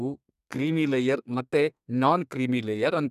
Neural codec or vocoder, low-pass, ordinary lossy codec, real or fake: codec, 32 kHz, 1.9 kbps, SNAC; 14.4 kHz; none; fake